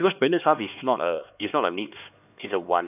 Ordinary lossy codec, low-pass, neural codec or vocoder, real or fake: none; 3.6 kHz; codec, 16 kHz, 2 kbps, X-Codec, HuBERT features, trained on LibriSpeech; fake